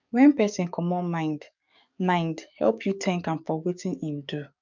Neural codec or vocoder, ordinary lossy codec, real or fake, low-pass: codec, 44.1 kHz, 7.8 kbps, DAC; none; fake; 7.2 kHz